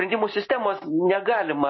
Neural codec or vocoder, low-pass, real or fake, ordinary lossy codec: none; 7.2 kHz; real; MP3, 24 kbps